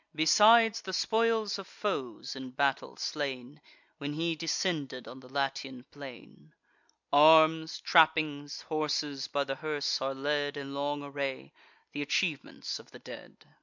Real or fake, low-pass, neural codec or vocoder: real; 7.2 kHz; none